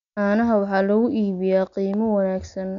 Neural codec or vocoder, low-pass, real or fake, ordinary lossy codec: none; 7.2 kHz; real; none